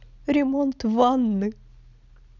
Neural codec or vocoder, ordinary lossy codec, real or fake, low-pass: none; none; real; 7.2 kHz